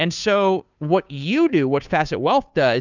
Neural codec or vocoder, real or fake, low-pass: codec, 16 kHz, 2 kbps, FunCodec, trained on Chinese and English, 25 frames a second; fake; 7.2 kHz